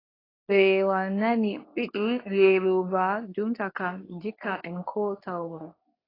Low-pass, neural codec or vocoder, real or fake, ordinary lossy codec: 5.4 kHz; codec, 24 kHz, 0.9 kbps, WavTokenizer, medium speech release version 2; fake; AAC, 24 kbps